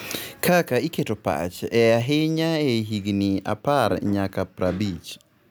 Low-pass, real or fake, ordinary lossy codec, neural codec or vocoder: none; real; none; none